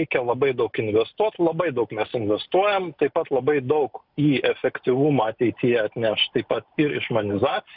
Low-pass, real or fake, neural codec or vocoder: 5.4 kHz; real; none